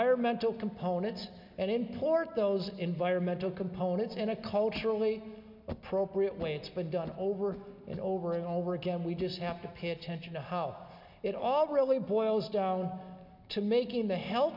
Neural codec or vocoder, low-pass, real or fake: none; 5.4 kHz; real